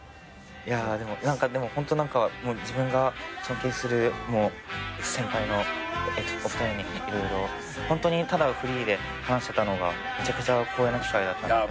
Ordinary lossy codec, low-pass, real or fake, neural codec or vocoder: none; none; real; none